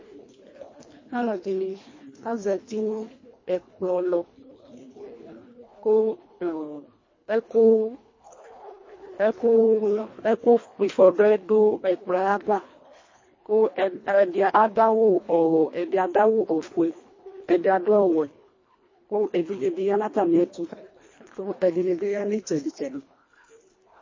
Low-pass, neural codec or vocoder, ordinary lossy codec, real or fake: 7.2 kHz; codec, 24 kHz, 1.5 kbps, HILCodec; MP3, 32 kbps; fake